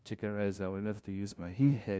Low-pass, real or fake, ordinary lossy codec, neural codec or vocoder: none; fake; none; codec, 16 kHz, 0.5 kbps, FunCodec, trained on LibriTTS, 25 frames a second